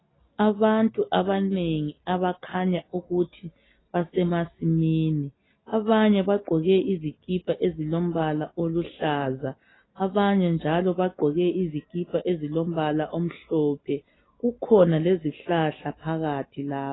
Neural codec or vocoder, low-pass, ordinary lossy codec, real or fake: none; 7.2 kHz; AAC, 16 kbps; real